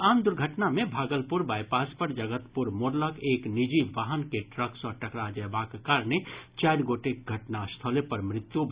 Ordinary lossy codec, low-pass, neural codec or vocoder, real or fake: Opus, 64 kbps; 3.6 kHz; none; real